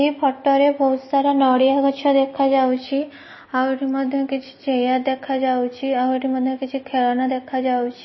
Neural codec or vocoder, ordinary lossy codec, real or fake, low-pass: none; MP3, 24 kbps; real; 7.2 kHz